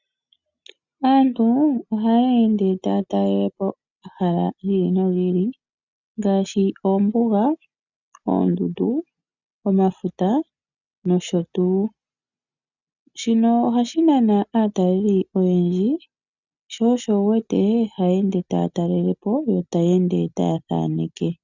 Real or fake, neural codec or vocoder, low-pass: real; none; 7.2 kHz